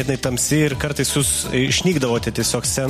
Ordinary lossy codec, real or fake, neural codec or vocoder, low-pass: MP3, 64 kbps; real; none; 14.4 kHz